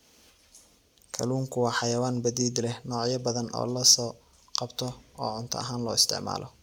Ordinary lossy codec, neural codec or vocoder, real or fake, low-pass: none; none; real; 19.8 kHz